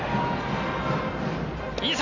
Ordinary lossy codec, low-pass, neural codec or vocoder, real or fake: none; 7.2 kHz; none; real